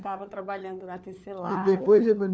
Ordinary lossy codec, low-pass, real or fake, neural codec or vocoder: none; none; fake; codec, 16 kHz, 4 kbps, FunCodec, trained on LibriTTS, 50 frames a second